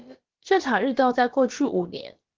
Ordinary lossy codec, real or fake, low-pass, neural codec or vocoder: Opus, 16 kbps; fake; 7.2 kHz; codec, 16 kHz, about 1 kbps, DyCAST, with the encoder's durations